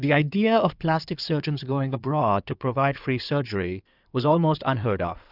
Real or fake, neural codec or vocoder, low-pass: fake; codec, 16 kHz in and 24 kHz out, 2.2 kbps, FireRedTTS-2 codec; 5.4 kHz